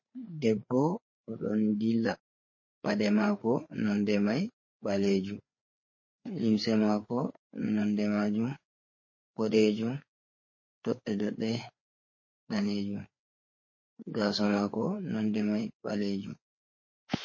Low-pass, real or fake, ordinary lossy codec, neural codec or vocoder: 7.2 kHz; fake; MP3, 32 kbps; codec, 16 kHz, 4 kbps, FreqCodec, larger model